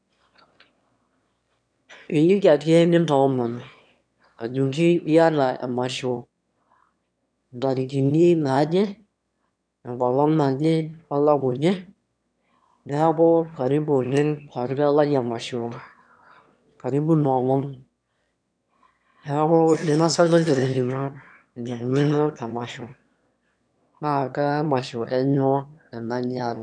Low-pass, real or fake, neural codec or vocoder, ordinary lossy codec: 9.9 kHz; fake; autoencoder, 22.05 kHz, a latent of 192 numbers a frame, VITS, trained on one speaker; none